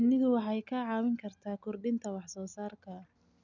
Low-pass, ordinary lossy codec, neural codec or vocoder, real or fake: 7.2 kHz; none; none; real